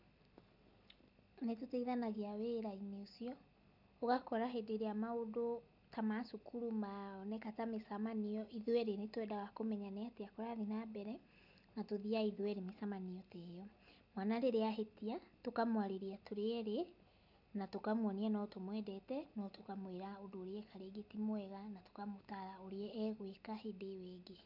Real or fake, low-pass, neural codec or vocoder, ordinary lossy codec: real; 5.4 kHz; none; none